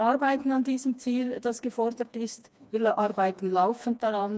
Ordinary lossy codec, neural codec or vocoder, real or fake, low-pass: none; codec, 16 kHz, 2 kbps, FreqCodec, smaller model; fake; none